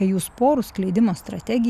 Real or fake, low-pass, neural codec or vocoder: real; 14.4 kHz; none